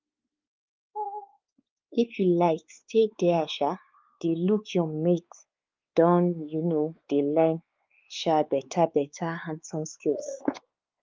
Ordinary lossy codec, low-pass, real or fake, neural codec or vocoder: Opus, 32 kbps; 7.2 kHz; fake; codec, 16 kHz, 4 kbps, X-Codec, WavLM features, trained on Multilingual LibriSpeech